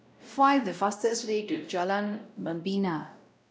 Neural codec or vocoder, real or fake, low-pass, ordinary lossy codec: codec, 16 kHz, 0.5 kbps, X-Codec, WavLM features, trained on Multilingual LibriSpeech; fake; none; none